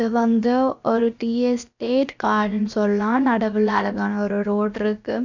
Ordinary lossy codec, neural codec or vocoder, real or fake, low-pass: none; codec, 16 kHz, about 1 kbps, DyCAST, with the encoder's durations; fake; 7.2 kHz